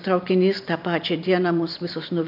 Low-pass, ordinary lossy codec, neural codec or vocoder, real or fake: 5.4 kHz; MP3, 48 kbps; none; real